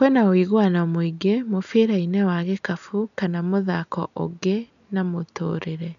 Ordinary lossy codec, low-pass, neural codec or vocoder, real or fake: none; 7.2 kHz; none; real